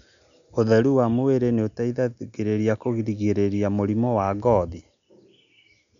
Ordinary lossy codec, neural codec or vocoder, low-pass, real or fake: none; none; 7.2 kHz; real